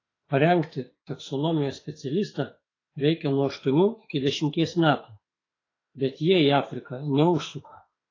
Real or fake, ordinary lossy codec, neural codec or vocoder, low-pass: fake; AAC, 32 kbps; autoencoder, 48 kHz, 32 numbers a frame, DAC-VAE, trained on Japanese speech; 7.2 kHz